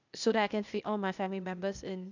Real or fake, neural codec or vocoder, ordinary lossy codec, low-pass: fake; codec, 16 kHz, 0.8 kbps, ZipCodec; none; 7.2 kHz